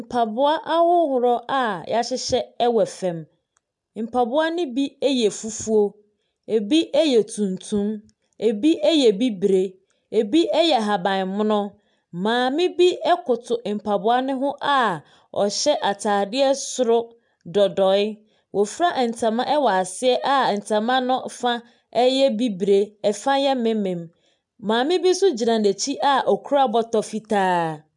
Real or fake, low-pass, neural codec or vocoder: real; 10.8 kHz; none